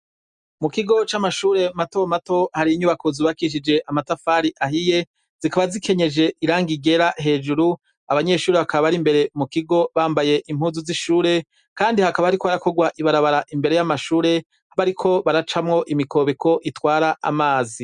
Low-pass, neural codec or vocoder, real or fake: 10.8 kHz; none; real